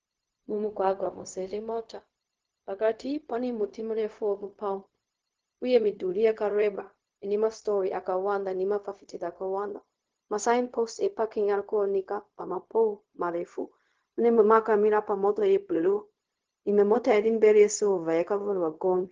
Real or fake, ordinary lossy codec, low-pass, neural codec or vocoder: fake; Opus, 16 kbps; 7.2 kHz; codec, 16 kHz, 0.4 kbps, LongCat-Audio-Codec